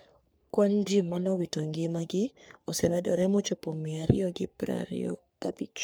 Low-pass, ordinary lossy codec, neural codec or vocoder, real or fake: none; none; codec, 44.1 kHz, 3.4 kbps, Pupu-Codec; fake